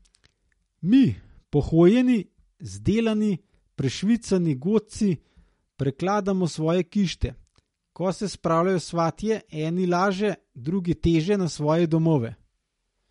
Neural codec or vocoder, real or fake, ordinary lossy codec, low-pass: none; real; MP3, 48 kbps; 19.8 kHz